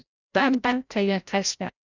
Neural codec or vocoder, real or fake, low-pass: codec, 16 kHz, 0.5 kbps, FreqCodec, larger model; fake; 7.2 kHz